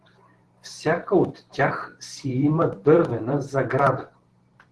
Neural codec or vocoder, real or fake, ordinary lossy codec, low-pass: vocoder, 44.1 kHz, 128 mel bands every 512 samples, BigVGAN v2; fake; Opus, 16 kbps; 10.8 kHz